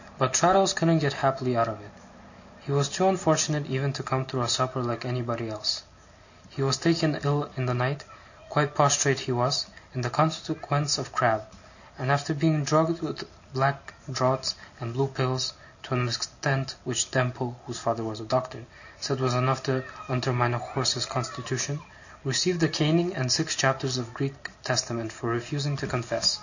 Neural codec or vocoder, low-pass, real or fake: none; 7.2 kHz; real